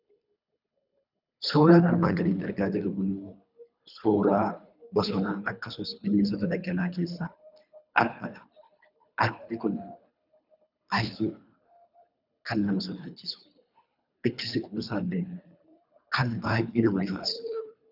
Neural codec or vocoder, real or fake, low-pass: codec, 24 kHz, 3 kbps, HILCodec; fake; 5.4 kHz